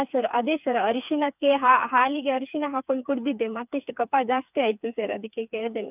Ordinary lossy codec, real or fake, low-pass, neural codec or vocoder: none; fake; 3.6 kHz; codec, 16 kHz, 4 kbps, FreqCodec, smaller model